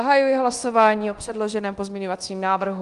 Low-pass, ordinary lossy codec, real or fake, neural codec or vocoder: 10.8 kHz; Opus, 24 kbps; fake; codec, 24 kHz, 0.9 kbps, DualCodec